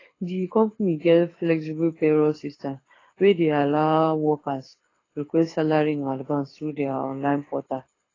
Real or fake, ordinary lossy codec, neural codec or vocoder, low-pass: fake; AAC, 32 kbps; codec, 24 kHz, 6 kbps, HILCodec; 7.2 kHz